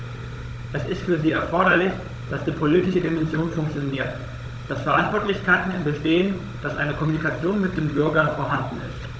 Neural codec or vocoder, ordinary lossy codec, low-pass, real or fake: codec, 16 kHz, 16 kbps, FunCodec, trained on Chinese and English, 50 frames a second; none; none; fake